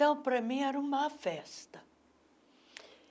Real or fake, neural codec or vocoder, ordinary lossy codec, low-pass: real; none; none; none